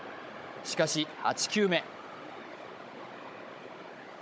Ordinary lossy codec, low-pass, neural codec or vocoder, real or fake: none; none; codec, 16 kHz, 16 kbps, FunCodec, trained on Chinese and English, 50 frames a second; fake